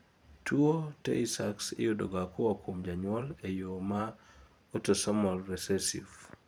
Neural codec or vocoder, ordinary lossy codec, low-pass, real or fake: vocoder, 44.1 kHz, 128 mel bands every 512 samples, BigVGAN v2; none; none; fake